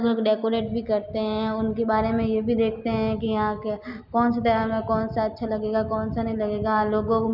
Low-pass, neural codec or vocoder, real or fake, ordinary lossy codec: 5.4 kHz; none; real; none